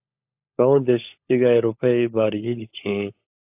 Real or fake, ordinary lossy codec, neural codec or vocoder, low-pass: fake; AAC, 32 kbps; codec, 16 kHz, 16 kbps, FunCodec, trained on LibriTTS, 50 frames a second; 3.6 kHz